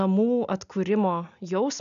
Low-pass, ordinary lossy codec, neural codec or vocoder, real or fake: 7.2 kHz; AAC, 96 kbps; none; real